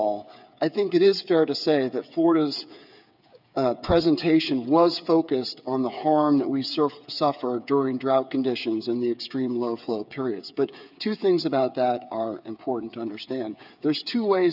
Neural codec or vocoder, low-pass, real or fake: codec, 16 kHz, 8 kbps, FreqCodec, smaller model; 5.4 kHz; fake